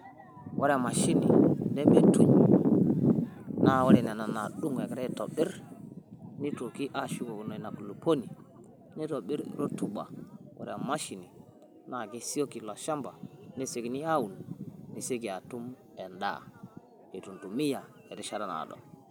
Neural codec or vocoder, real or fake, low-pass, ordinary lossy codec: none; real; none; none